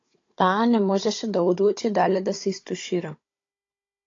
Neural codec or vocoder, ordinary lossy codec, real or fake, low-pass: codec, 16 kHz, 16 kbps, FunCodec, trained on Chinese and English, 50 frames a second; AAC, 32 kbps; fake; 7.2 kHz